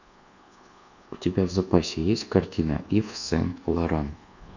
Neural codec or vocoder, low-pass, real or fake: codec, 24 kHz, 1.2 kbps, DualCodec; 7.2 kHz; fake